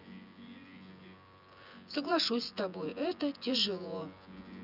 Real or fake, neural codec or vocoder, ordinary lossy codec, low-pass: fake; vocoder, 24 kHz, 100 mel bands, Vocos; none; 5.4 kHz